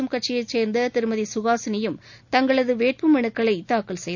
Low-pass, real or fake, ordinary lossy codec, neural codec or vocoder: 7.2 kHz; real; none; none